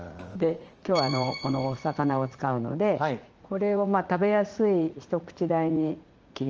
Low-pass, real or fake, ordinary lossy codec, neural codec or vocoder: 7.2 kHz; fake; Opus, 24 kbps; vocoder, 44.1 kHz, 80 mel bands, Vocos